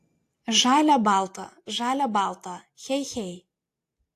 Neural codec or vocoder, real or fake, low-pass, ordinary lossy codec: none; real; 14.4 kHz; AAC, 64 kbps